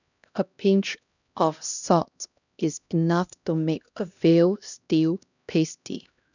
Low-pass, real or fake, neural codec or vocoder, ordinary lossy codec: 7.2 kHz; fake; codec, 16 kHz, 1 kbps, X-Codec, HuBERT features, trained on LibriSpeech; none